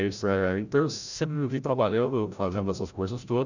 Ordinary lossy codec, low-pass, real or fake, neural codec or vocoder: none; 7.2 kHz; fake; codec, 16 kHz, 0.5 kbps, FreqCodec, larger model